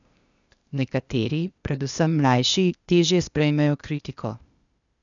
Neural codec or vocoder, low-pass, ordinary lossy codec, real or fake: codec, 16 kHz, 0.8 kbps, ZipCodec; 7.2 kHz; none; fake